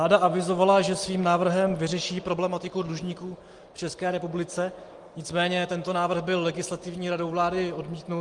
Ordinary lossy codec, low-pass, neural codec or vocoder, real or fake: Opus, 24 kbps; 10.8 kHz; none; real